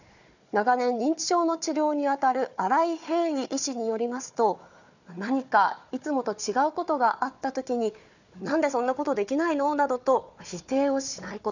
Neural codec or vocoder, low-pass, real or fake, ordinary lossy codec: codec, 16 kHz, 4 kbps, FunCodec, trained on Chinese and English, 50 frames a second; 7.2 kHz; fake; none